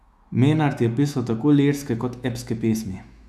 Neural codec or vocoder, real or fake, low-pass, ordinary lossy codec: autoencoder, 48 kHz, 128 numbers a frame, DAC-VAE, trained on Japanese speech; fake; 14.4 kHz; none